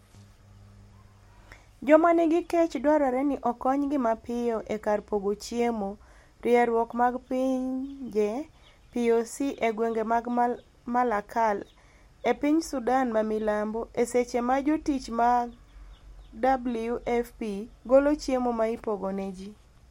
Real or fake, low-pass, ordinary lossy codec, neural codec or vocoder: real; 19.8 kHz; MP3, 64 kbps; none